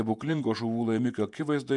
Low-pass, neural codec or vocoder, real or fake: 10.8 kHz; vocoder, 48 kHz, 128 mel bands, Vocos; fake